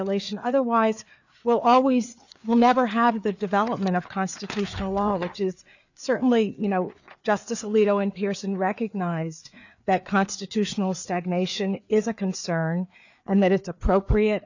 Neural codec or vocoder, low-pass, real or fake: codec, 16 kHz, 4 kbps, FreqCodec, larger model; 7.2 kHz; fake